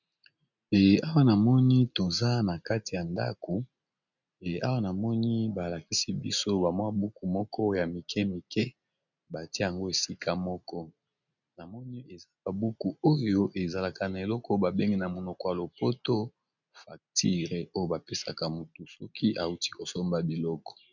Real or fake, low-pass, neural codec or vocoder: real; 7.2 kHz; none